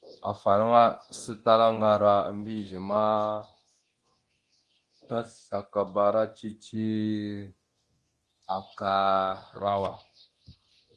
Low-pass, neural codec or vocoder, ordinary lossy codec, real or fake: 10.8 kHz; codec, 24 kHz, 0.9 kbps, DualCodec; Opus, 24 kbps; fake